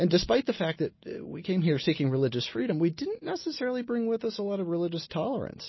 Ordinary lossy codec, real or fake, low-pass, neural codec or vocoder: MP3, 24 kbps; real; 7.2 kHz; none